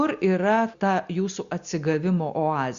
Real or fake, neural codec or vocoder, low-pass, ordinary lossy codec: real; none; 7.2 kHz; Opus, 64 kbps